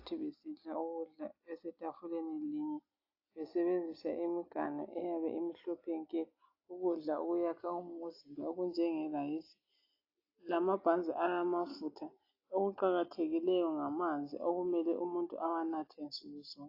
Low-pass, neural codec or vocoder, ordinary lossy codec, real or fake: 5.4 kHz; none; AAC, 32 kbps; real